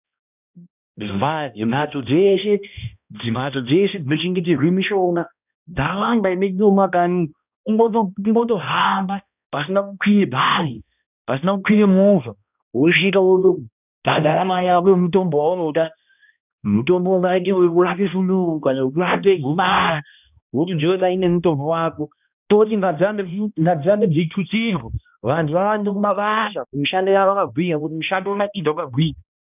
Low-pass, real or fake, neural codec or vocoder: 3.6 kHz; fake; codec, 16 kHz, 1 kbps, X-Codec, HuBERT features, trained on balanced general audio